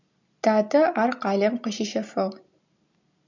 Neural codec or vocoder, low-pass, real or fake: none; 7.2 kHz; real